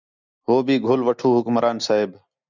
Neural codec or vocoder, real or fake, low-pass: none; real; 7.2 kHz